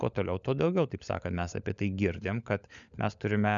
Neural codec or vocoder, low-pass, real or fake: codec, 16 kHz, 16 kbps, FunCodec, trained on LibriTTS, 50 frames a second; 7.2 kHz; fake